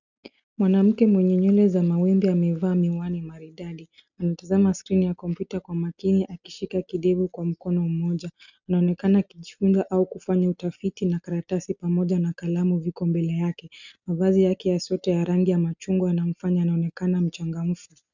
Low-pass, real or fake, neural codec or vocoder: 7.2 kHz; real; none